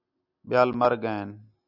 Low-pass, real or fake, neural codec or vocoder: 5.4 kHz; real; none